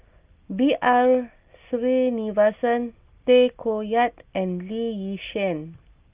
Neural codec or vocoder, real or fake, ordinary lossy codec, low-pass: none; real; Opus, 32 kbps; 3.6 kHz